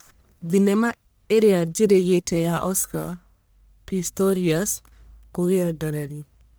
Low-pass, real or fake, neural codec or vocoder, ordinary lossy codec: none; fake; codec, 44.1 kHz, 1.7 kbps, Pupu-Codec; none